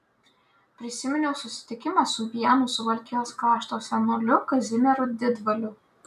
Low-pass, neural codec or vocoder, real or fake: 14.4 kHz; none; real